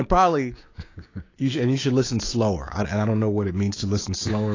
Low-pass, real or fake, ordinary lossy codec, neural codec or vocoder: 7.2 kHz; fake; AAC, 32 kbps; codec, 16 kHz, 6 kbps, DAC